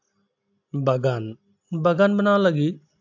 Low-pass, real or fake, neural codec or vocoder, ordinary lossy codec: 7.2 kHz; real; none; AAC, 48 kbps